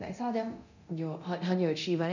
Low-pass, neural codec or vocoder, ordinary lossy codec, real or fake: 7.2 kHz; codec, 24 kHz, 0.9 kbps, DualCodec; none; fake